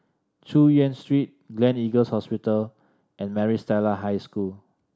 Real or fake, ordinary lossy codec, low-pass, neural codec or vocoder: real; none; none; none